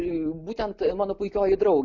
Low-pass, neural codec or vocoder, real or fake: 7.2 kHz; none; real